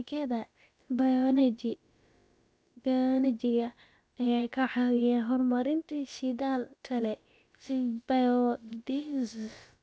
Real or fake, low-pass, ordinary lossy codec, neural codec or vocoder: fake; none; none; codec, 16 kHz, about 1 kbps, DyCAST, with the encoder's durations